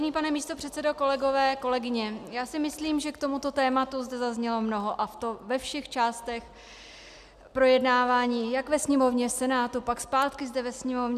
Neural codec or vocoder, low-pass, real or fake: none; 14.4 kHz; real